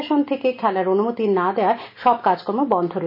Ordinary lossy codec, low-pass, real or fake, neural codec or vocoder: none; 5.4 kHz; real; none